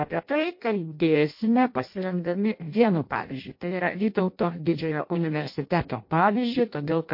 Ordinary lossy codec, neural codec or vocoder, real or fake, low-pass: MP3, 32 kbps; codec, 16 kHz in and 24 kHz out, 0.6 kbps, FireRedTTS-2 codec; fake; 5.4 kHz